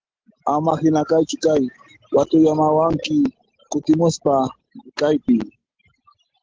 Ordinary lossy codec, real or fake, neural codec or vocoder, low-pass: Opus, 16 kbps; real; none; 7.2 kHz